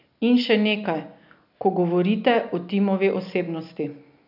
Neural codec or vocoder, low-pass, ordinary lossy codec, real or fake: none; 5.4 kHz; none; real